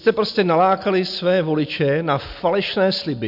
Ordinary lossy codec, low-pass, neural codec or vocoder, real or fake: MP3, 48 kbps; 5.4 kHz; none; real